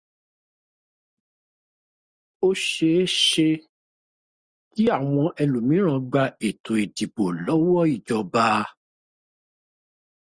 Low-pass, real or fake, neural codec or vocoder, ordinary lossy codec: 9.9 kHz; real; none; Opus, 64 kbps